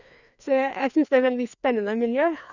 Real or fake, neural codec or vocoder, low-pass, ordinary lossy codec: fake; codec, 16 kHz, 2 kbps, FreqCodec, larger model; 7.2 kHz; none